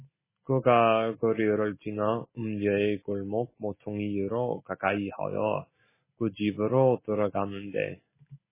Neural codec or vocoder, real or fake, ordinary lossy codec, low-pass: none; real; MP3, 16 kbps; 3.6 kHz